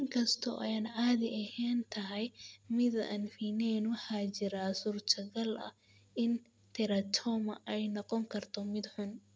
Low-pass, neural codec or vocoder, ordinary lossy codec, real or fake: none; none; none; real